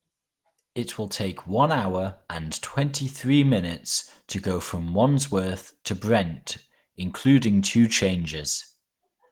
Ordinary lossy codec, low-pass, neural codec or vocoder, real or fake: Opus, 16 kbps; 19.8 kHz; none; real